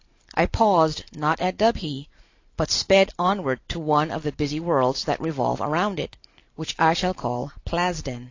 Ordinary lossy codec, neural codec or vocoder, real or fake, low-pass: AAC, 48 kbps; none; real; 7.2 kHz